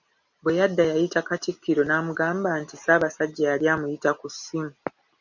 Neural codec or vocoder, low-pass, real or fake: none; 7.2 kHz; real